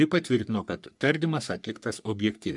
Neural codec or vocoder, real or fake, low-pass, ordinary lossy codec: codec, 44.1 kHz, 3.4 kbps, Pupu-Codec; fake; 10.8 kHz; MP3, 96 kbps